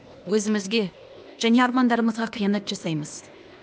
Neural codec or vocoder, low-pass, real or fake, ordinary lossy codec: codec, 16 kHz, 0.8 kbps, ZipCodec; none; fake; none